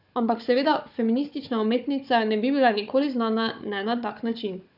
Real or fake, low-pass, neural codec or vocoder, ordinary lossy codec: fake; 5.4 kHz; codec, 16 kHz, 4 kbps, FunCodec, trained on Chinese and English, 50 frames a second; none